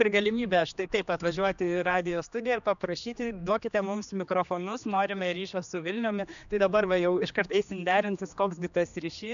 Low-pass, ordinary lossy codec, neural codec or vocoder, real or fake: 7.2 kHz; MP3, 96 kbps; codec, 16 kHz, 2 kbps, X-Codec, HuBERT features, trained on general audio; fake